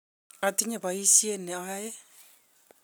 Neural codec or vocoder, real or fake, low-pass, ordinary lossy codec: none; real; none; none